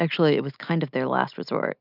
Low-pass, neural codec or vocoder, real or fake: 5.4 kHz; none; real